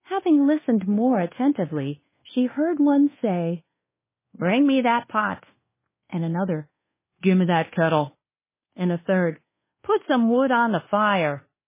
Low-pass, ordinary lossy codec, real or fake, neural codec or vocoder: 3.6 kHz; MP3, 16 kbps; fake; codec, 24 kHz, 1.2 kbps, DualCodec